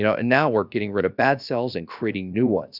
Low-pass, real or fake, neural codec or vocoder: 5.4 kHz; fake; codec, 16 kHz, about 1 kbps, DyCAST, with the encoder's durations